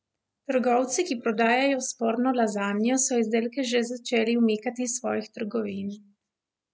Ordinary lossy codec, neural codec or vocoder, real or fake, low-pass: none; none; real; none